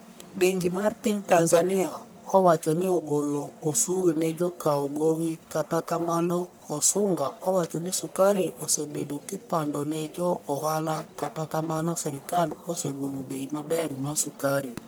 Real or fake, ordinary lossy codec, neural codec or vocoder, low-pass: fake; none; codec, 44.1 kHz, 1.7 kbps, Pupu-Codec; none